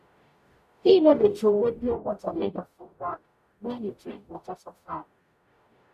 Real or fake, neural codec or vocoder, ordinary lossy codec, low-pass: fake; codec, 44.1 kHz, 0.9 kbps, DAC; none; 14.4 kHz